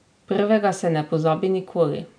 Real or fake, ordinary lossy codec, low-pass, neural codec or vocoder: real; none; 9.9 kHz; none